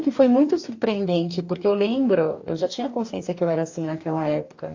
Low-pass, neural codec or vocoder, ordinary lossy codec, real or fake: 7.2 kHz; codec, 44.1 kHz, 2.6 kbps, DAC; AAC, 48 kbps; fake